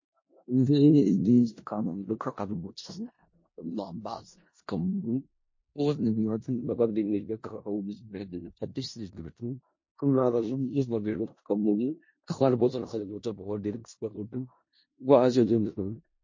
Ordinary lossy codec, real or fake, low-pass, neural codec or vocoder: MP3, 32 kbps; fake; 7.2 kHz; codec, 16 kHz in and 24 kHz out, 0.4 kbps, LongCat-Audio-Codec, four codebook decoder